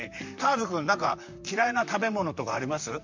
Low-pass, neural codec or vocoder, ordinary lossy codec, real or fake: 7.2 kHz; vocoder, 44.1 kHz, 128 mel bands, Pupu-Vocoder; MP3, 48 kbps; fake